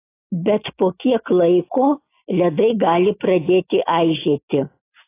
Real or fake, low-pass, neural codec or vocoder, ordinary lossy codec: real; 3.6 kHz; none; AAC, 24 kbps